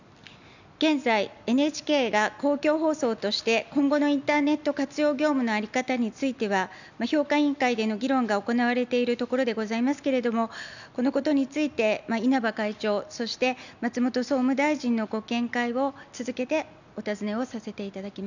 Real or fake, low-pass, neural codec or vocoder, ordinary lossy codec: real; 7.2 kHz; none; none